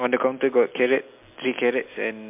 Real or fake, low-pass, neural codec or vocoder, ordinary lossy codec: real; 3.6 kHz; none; MP3, 32 kbps